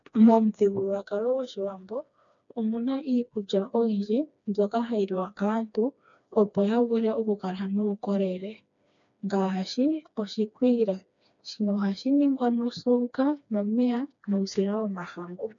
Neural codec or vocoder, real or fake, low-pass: codec, 16 kHz, 2 kbps, FreqCodec, smaller model; fake; 7.2 kHz